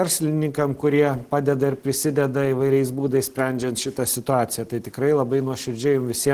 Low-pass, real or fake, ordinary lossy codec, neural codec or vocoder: 14.4 kHz; real; Opus, 16 kbps; none